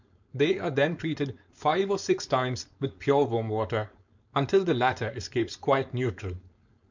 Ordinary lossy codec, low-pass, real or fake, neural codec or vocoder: MP3, 64 kbps; 7.2 kHz; fake; codec, 16 kHz, 4.8 kbps, FACodec